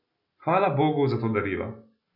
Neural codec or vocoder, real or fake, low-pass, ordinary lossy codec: none; real; 5.4 kHz; none